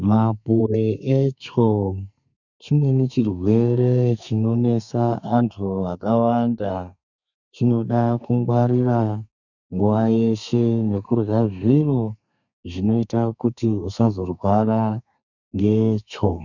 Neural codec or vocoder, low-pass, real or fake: codec, 32 kHz, 1.9 kbps, SNAC; 7.2 kHz; fake